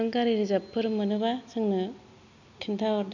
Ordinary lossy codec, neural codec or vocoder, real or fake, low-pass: none; none; real; 7.2 kHz